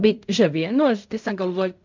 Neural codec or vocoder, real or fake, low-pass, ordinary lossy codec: codec, 16 kHz in and 24 kHz out, 0.4 kbps, LongCat-Audio-Codec, fine tuned four codebook decoder; fake; 7.2 kHz; MP3, 64 kbps